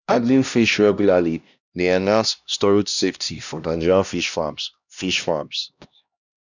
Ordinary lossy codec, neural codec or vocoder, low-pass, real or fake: none; codec, 16 kHz, 1 kbps, X-Codec, HuBERT features, trained on LibriSpeech; 7.2 kHz; fake